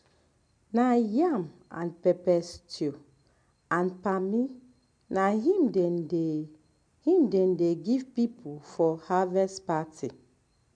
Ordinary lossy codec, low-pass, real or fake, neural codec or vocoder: MP3, 64 kbps; 9.9 kHz; real; none